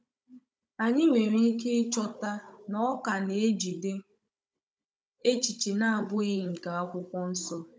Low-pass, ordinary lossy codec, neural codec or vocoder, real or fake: none; none; codec, 16 kHz, 16 kbps, FunCodec, trained on Chinese and English, 50 frames a second; fake